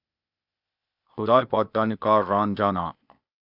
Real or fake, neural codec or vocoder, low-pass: fake; codec, 16 kHz, 0.8 kbps, ZipCodec; 5.4 kHz